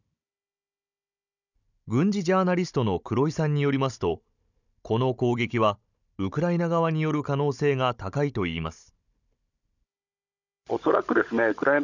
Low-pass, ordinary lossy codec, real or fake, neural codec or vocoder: 7.2 kHz; none; fake; codec, 16 kHz, 16 kbps, FunCodec, trained on Chinese and English, 50 frames a second